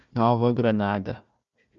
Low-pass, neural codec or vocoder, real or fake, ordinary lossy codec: 7.2 kHz; codec, 16 kHz, 1 kbps, FunCodec, trained on Chinese and English, 50 frames a second; fake; Opus, 64 kbps